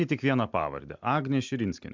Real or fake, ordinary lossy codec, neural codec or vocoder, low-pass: real; MP3, 64 kbps; none; 7.2 kHz